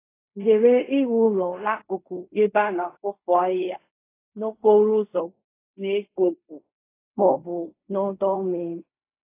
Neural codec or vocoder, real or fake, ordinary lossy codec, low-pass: codec, 16 kHz in and 24 kHz out, 0.4 kbps, LongCat-Audio-Codec, fine tuned four codebook decoder; fake; AAC, 24 kbps; 3.6 kHz